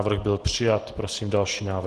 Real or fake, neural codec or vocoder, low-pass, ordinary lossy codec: real; none; 10.8 kHz; Opus, 16 kbps